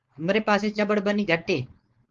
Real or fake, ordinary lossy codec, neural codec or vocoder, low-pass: fake; Opus, 32 kbps; codec, 16 kHz, 4.8 kbps, FACodec; 7.2 kHz